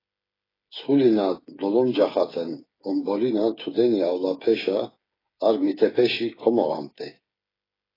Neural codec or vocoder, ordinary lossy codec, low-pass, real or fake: codec, 16 kHz, 8 kbps, FreqCodec, smaller model; AAC, 24 kbps; 5.4 kHz; fake